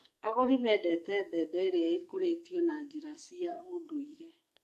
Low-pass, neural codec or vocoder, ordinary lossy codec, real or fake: 14.4 kHz; codec, 44.1 kHz, 2.6 kbps, SNAC; AAC, 64 kbps; fake